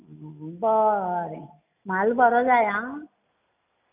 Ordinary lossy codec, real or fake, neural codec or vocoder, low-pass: none; real; none; 3.6 kHz